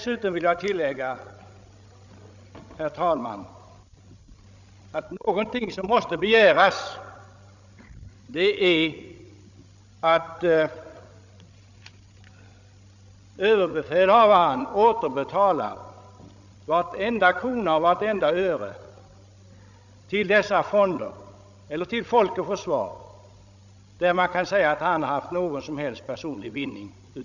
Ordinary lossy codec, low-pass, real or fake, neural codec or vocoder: none; 7.2 kHz; fake; codec, 16 kHz, 16 kbps, FreqCodec, larger model